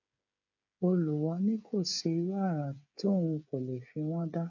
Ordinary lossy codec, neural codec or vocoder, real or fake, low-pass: none; codec, 16 kHz, 8 kbps, FreqCodec, smaller model; fake; 7.2 kHz